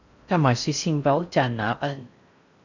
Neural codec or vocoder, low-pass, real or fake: codec, 16 kHz in and 24 kHz out, 0.6 kbps, FocalCodec, streaming, 4096 codes; 7.2 kHz; fake